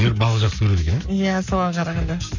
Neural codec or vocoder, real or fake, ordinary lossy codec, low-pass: codec, 44.1 kHz, 7.8 kbps, Pupu-Codec; fake; none; 7.2 kHz